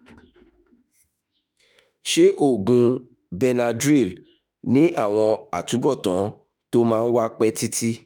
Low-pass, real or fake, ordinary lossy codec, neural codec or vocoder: none; fake; none; autoencoder, 48 kHz, 32 numbers a frame, DAC-VAE, trained on Japanese speech